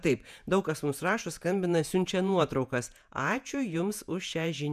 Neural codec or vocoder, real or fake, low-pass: vocoder, 48 kHz, 128 mel bands, Vocos; fake; 14.4 kHz